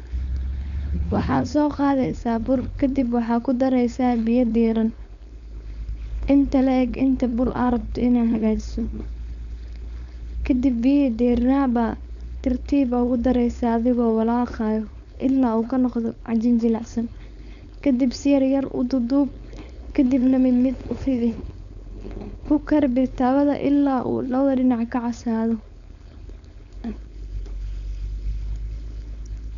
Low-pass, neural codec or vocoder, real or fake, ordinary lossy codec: 7.2 kHz; codec, 16 kHz, 4.8 kbps, FACodec; fake; none